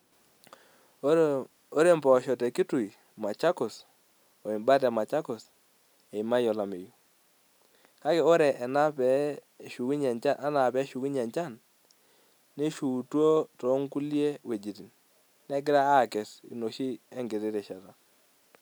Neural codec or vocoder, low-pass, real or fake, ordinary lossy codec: none; none; real; none